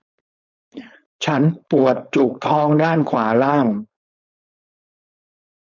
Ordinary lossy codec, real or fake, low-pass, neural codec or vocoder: none; fake; 7.2 kHz; codec, 16 kHz, 4.8 kbps, FACodec